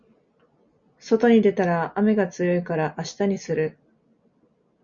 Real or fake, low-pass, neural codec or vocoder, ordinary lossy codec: real; 7.2 kHz; none; Opus, 64 kbps